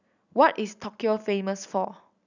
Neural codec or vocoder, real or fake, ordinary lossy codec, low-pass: none; real; none; 7.2 kHz